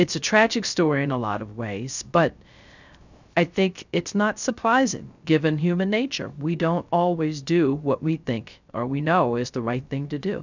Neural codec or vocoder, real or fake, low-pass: codec, 16 kHz, 0.3 kbps, FocalCodec; fake; 7.2 kHz